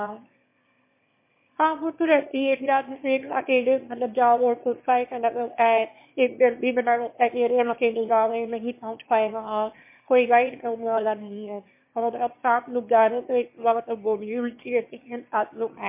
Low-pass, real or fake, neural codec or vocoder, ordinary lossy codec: 3.6 kHz; fake; autoencoder, 22.05 kHz, a latent of 192 numbers a frame, VITS, trained on one speaker; MP3, 24 kbps